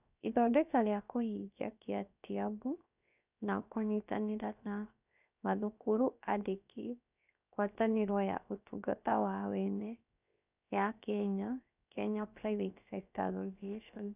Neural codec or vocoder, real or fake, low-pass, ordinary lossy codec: codec, 16 kHz, 0.7 kbps, FocalCodec; fake; 3.6 kHz; none